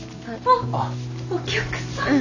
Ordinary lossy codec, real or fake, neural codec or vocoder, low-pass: none; real; none; 7.2 kHz